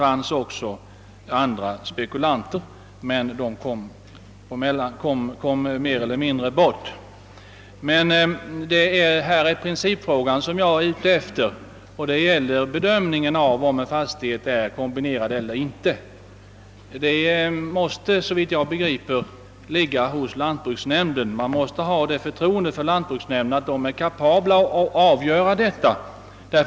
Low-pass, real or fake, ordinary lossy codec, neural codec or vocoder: none; real; none; none